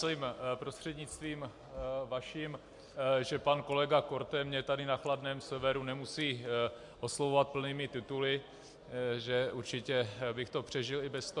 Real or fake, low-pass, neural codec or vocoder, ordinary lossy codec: real; 10.8 kHz; none; MP3, 64 kbps